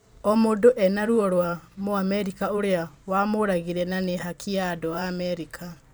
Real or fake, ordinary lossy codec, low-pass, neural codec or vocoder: fake; none; none; vocoder, 44.1 kHz, 128 mel bands every 512 samples, BigVGAN v2